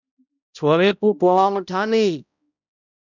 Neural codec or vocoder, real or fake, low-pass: codec, 16 kHz, 0.5 kbps, X-Codec, HuBERT features, trained on balanced general audio; fake; 7.2 kHz